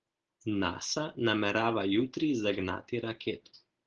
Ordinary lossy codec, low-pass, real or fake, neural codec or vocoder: Opus, 16 kbps; 7.2 kHz; real; none